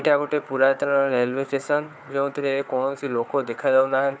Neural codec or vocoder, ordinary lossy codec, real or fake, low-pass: codec, 16 kHz, 4 kbps, FunCodec, trained on Chinese and English, 50 frames a second; none; fake; none